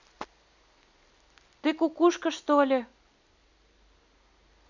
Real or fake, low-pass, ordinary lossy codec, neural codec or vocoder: real; 7.2 kHz; none; none